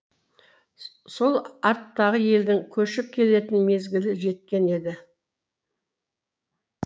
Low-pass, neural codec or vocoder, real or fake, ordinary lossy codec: none; codec, 16 kHz, 6 kbps, DAC; fake; none